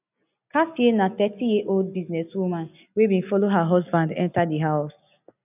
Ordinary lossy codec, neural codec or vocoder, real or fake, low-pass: none; none; real; 3.6 kHz